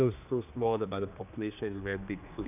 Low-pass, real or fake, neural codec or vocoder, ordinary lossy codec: 3.6 kHz; fake; codec, 16 kHz, 2 kbps, X-Codec, HuBERT features, trained on general audio; none